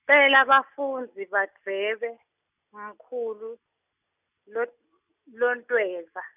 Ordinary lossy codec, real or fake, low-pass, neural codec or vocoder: none; real; 3.6 kHz; none